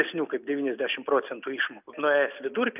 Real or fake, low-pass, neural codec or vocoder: real; 3.6 kHz; none